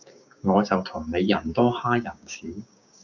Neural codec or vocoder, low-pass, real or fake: codec, 16 kHz, 6 kbps, DAC; 7.2 kHz; fake